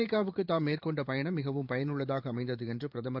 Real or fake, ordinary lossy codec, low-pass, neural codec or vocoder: fake; Opus, 24 kbps; 5.4 kHz; vocoder, 44.1 kHz, 128 mel bands every 512 samples, BigVGAN v2